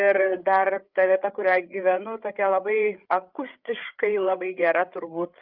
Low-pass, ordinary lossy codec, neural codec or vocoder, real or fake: 5.4 kHz; Opus, 24 kbps; codec, 16 kHz, 8 kbps, FreqCodec, larger model; fake